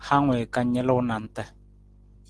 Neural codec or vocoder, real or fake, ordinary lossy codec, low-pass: none; real; Opus, 16 kbps; 10.8 kHz